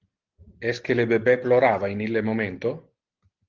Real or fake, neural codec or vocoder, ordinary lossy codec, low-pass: real; none; Opus, 16 kbps; 7.2 kHz